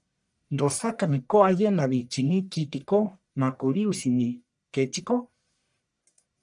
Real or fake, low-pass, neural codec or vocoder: fake; 10.8 kHz; codec, 44.1 kHz, 1.7 kbps, Pupu-Codec